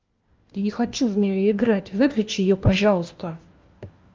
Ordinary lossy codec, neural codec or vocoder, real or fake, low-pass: Opus, 32 kbps; codec, 16 kHz, 1 kbps, FunCodec, trained on LibriTTS, 50 frames a second; fake; 7.2 kHz